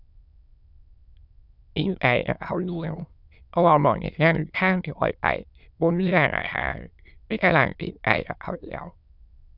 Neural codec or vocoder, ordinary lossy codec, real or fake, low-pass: autoencoder, 22.05 kHz, a latent of 192 numbers a frame, VITS, trained on many speakers; none; fake; 5.4 kHz